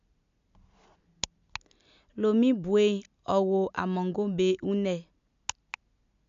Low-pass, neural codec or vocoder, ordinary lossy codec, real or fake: 7.2 kHz; none; MP3, 64 kbps; real